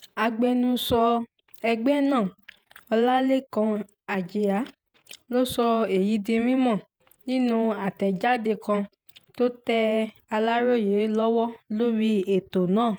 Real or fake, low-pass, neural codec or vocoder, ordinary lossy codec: fake; none; vocoder, 48 kHz, 128 mel bands, Vocos; none